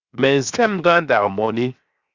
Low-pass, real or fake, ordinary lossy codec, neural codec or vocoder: 7.2 kHz; fake; Opus, 64 kbps; codec, 16 kHz, 0.7 kbps, FocalCodec